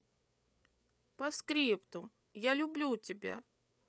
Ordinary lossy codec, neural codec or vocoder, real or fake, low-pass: none; codec, 16 kHz, 8 kbps, FreqCodec, larger model; fake; none